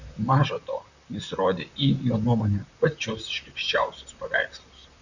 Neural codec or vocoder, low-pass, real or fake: vocoder, 44.1 kHz, 128 mel bands, Pupu-Vocoder; 7.2 kHz; fake